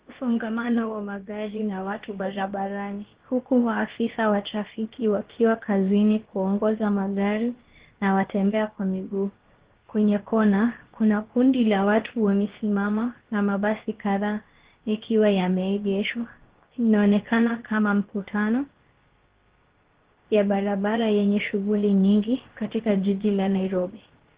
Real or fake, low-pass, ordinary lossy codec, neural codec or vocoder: fake; 3.6 kHz; Opus, 16 kbps; codec, 16 kHz, about 1 kbps, DyCAST, with the encoder's durations